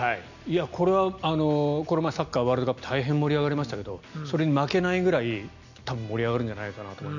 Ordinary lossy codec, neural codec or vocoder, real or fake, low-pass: none; none; real; 7.2 kHz